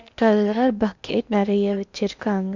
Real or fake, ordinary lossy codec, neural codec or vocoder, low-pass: fake; Opus, 64 kbps; codec, 16 kHz in and 24 kHz out, 0.8 kbps, FocalCodec, streaming, 65536 codes; 7.2 kHz